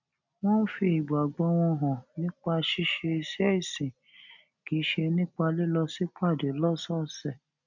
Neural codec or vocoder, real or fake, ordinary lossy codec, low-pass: none; real; none; 7.2 kHz